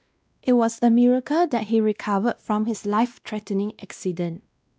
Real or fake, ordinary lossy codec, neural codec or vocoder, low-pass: fake; none; codec, 16 kHz, 1 kbps, X-Codec, WavLM features, trained on Multilingual LibriSpeech; none